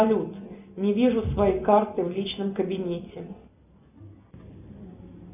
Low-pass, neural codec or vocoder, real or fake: 3.6 kHz; none; real